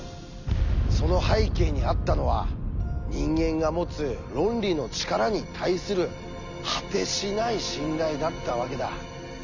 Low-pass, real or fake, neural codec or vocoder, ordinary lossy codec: 7.2 kHz; real; none; none